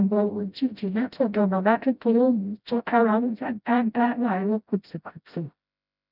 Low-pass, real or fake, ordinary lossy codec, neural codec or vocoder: 5.4 kHz; fake; none; codec, 16 kHz, 0.5 kbps, FreqCodec, smaller model